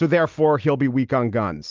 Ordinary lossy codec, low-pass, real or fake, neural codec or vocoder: Opus, 24 kbps; 7.2 kHz; real; none